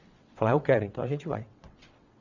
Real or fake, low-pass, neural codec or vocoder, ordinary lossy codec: fake; 7.2 kHz; vocoder, 22.05 kHz, 80 mel bands, WaveNeXt; none